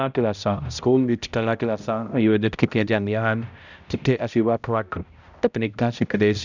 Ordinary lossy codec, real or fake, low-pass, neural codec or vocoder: none; fake; 7.2 kHz; codec, 16 kHz, 0.5 kbps, X-Codec, HuBERT features, trained on balanced general audio